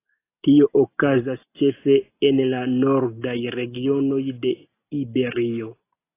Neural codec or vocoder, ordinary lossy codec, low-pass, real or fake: none; AAC, 24 kbps; 3.6 kHz; real